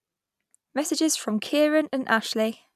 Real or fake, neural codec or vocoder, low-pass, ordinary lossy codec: real; none; 14.4 kHz; none